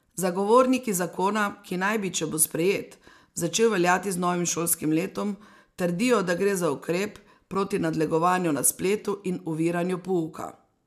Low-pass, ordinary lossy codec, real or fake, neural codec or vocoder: 14.4 kHz; MP3, 96 kbps; real; none